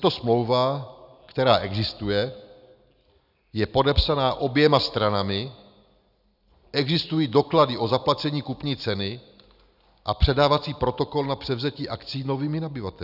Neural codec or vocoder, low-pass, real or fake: none; 5.4 kHz; real